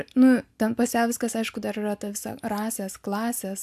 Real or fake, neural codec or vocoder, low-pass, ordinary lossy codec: real; none; 14.4 kHz; AAC, 96 kbps